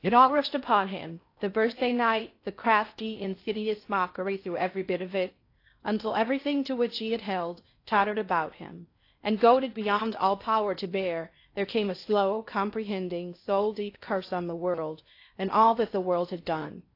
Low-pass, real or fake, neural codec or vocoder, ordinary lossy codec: 5.4 kHz; fake; codec, 16 kHz in and 24 kHz out, 0.6 kbps, FocalCodec, streaming, 4096 codes; AAC, 32 kbps